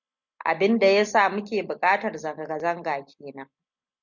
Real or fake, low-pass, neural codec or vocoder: real; 7.2 kHz; none